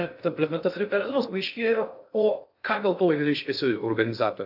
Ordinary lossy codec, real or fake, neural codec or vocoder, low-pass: AAC, 48 kbps; fake; codec, 16 kHz in and 24 kHz out, 0.6 kbps, FocalCodec, streaming, 2048 codes; 5.4 kHz